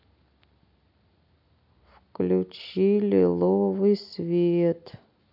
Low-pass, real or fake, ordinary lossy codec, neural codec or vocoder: 5.4 kHz; real; AAC, 48 kbps; none